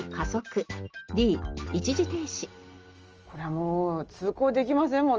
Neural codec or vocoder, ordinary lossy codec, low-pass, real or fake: none; Opus, 24 kbps; 7.2 kHz; real